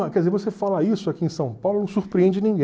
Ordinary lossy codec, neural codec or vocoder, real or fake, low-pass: none; none; real; none